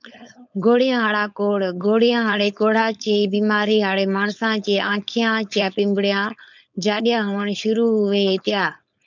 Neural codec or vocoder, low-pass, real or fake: codec, 16 kHz, 4.8 kbps, FACodec; 7.2 kHz; fake